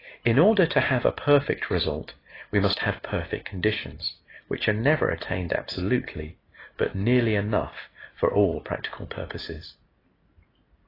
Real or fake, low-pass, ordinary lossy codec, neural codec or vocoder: real; 5.4 kHz; AAC, 24 kbps; none